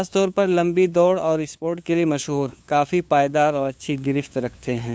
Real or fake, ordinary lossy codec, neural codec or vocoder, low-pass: fake; none; codec, 16 kHz, 2 kbps, FunCodec, trained on LibriTTS, 25 frames a second; none